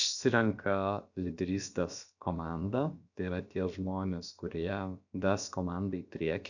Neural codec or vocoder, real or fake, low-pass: codec, 16 kHz, about 1 kbps, DyCAST, with the encoder's durations; fake; 7.2 kHz